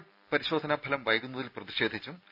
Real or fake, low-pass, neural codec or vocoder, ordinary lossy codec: real; 5.4 kHz; none; none